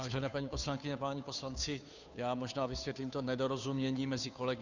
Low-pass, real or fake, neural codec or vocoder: 7.2 kHz; fake; codec, 16 kHz, 2 kbps, FunCodec, trained on Chinese and English, 25 frames a second